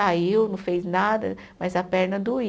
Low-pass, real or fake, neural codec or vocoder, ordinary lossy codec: none; real; none; none